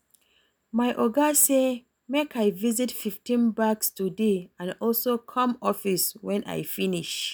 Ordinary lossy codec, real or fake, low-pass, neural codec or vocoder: none; real; none; none